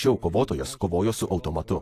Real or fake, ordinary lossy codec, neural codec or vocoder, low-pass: real; AAC, 64 kbps; none; 14.4 kHz